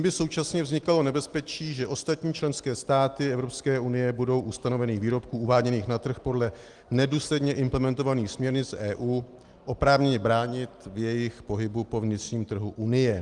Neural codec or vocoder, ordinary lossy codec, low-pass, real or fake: none; Opus, 24 kbps; 10.8 kHz; real